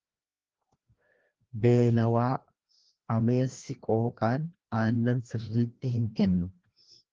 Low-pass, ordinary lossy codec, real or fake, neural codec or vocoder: 7.2 kHz; Opus, 16 kbps; fake; codec, 16 kHz, 1 kbps, FreqCodec, larger model